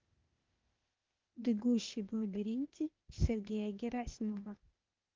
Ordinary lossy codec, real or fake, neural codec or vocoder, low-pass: Opus, 24 kbps; fake; codec, 16 kHz, 0.8 kbps, ZipCodec; 7.2 kHz